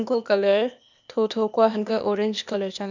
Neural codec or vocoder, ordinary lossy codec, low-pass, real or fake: codec, 16 kHz, 0.8 kbps, ZipCodec; none; 7.2 kHz; fake